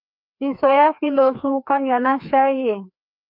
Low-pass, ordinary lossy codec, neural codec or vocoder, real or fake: 5.4 kHz; MP3, 48 kbps; codec, 16 kHz, 2 kbps, FreqCodec, larger model; fake